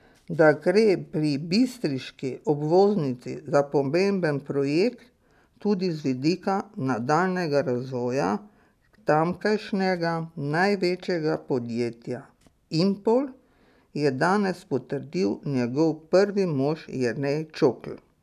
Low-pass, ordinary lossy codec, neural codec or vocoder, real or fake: 14.4 kHz; none; none; real